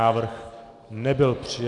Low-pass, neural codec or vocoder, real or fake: 10.8 kHz; none; real